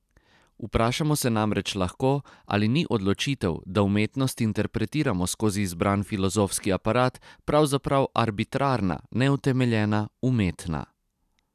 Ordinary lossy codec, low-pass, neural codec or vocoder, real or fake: none; 14.4 kHz; none; real